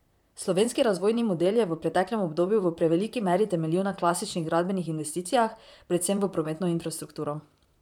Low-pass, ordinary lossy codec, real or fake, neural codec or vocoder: 19.8 kHz; none; fake; vocoder, 44.1 kHz, 128 mel bands, Pupu-Vocoder